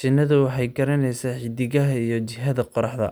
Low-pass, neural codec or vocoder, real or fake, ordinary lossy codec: none; none; real; none